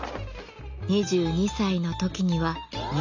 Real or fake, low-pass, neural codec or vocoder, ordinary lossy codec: real; 7.2 kHz; none; none